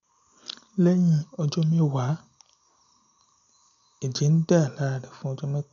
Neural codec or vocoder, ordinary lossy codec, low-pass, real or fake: none; none; 7.2 kHz; real